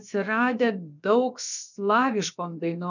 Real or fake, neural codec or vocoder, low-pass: fake; codec, 16 kHz, about 1 kbps, DyCAST, with the encoder's durations; 7.2 kHz